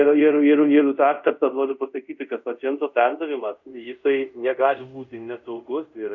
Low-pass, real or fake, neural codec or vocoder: 7.2 kHz; fake; codec, 24 kHz, 0.5 kbps, DualCodec